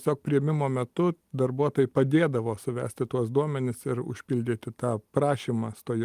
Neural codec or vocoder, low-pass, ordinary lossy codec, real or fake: none; 14.4 kHz; Opus, 32 kbps; real